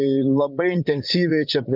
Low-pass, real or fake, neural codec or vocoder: 5.4 kHz; fake; vocoder, 44.1 kHz, 80 mel bands, Vocos